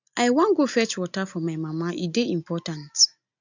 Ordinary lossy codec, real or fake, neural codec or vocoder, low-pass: none; real; none; 7.2 kHz